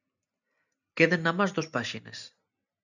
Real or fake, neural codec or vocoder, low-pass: real; none; 7.2 kHz